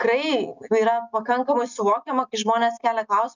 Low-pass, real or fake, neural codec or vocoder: 7.2 kHz; real; none